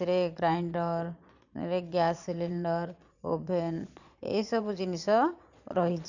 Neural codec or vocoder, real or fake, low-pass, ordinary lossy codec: vocoder, 22.05 kHz, 80 mel bands, Vocos; fake; 7.2 kHz; none